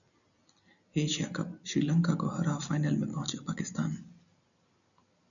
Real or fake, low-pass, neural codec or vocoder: real; 7.2 kHz; none